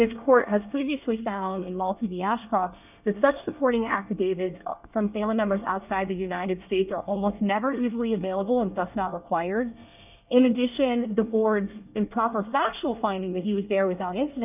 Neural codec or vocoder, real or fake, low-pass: codec, 24 kHz, 1 kbps, SNAC; fake; 3.6 kHz